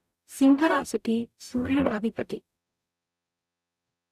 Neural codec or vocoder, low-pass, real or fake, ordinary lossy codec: codec, 44.1 kHz, 0.9 kbps, DAC; 14.4 kHz; fake; none